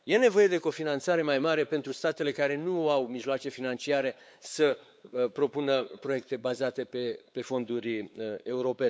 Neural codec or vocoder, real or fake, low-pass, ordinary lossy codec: codec, 16 kHz, 4 kbps, X-Codec, WavLM features, trained on Multilingual LibriSpeech; fake; none; none